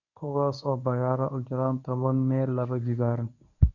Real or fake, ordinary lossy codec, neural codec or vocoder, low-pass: fake; none; codec, 24 kHz, 0.9 kbps, WavTokenizer, medium speech release version 1; 7.2 kHz